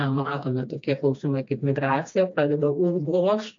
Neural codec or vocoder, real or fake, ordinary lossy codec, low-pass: codec, 16 kHz, 2 kbps, FreqCodec, smaller model; fake; MP3, 48 kbps; 7.2 kHz